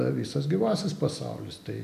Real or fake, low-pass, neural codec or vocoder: real; 14.4 kHz; none